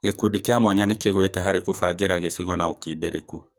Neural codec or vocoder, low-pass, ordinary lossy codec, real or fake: codec, 44.1 kHz, 2.6 kbps, SNAC; none; none; fake